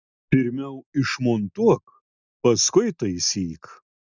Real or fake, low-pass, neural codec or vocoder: real; 7.2 kHz; none